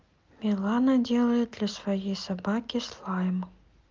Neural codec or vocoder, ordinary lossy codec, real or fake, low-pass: none; Opus, 32 kbps; real; 7.2 kHz